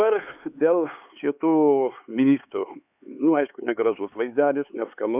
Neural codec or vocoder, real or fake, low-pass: codec, 16 kHz, 4 kbps, X-Codec, HuBERT features, trained on LibriSpeech; fake; 3.6 kHz